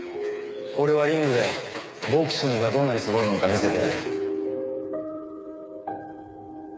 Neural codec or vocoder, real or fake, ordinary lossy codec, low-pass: codec, 16 kHz, 4 kbps, FreqCodec, smaller model; fake; none; none